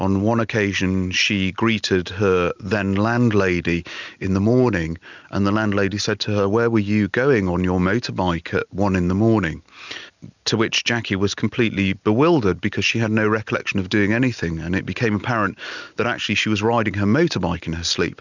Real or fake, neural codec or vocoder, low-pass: real; none; 7.2 kHz